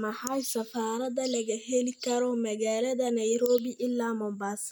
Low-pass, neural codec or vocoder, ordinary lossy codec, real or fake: none; none; none; real